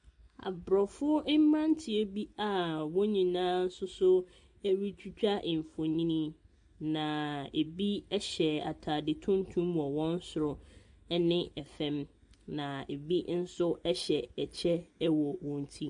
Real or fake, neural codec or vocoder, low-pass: real; none; 9.9 kHz